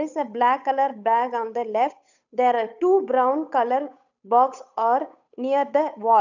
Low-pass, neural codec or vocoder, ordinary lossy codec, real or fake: 7.2 kHz; codec, 16 kHz, 8 kbps, FunCodec, trained on Chinese and English, 25 frames a second; none; fake